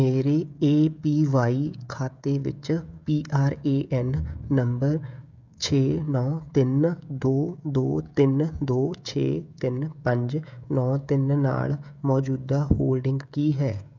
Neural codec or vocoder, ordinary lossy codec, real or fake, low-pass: codec, 16 kHz, 16 kbps, FreqCodec, smaller model; none; fake; 7.2 kHz